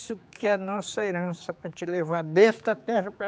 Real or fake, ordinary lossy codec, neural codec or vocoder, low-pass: fake; none; codec, 16 kHz, 4 kbps, X-Codec, HuBERT features, trained on general audio; none